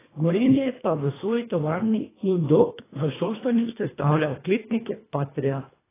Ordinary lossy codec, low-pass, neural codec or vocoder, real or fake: AAC, 16 kbps; 3.6 kHz; codec, 24 kHz, 1.5 kbps, HILCodec; fake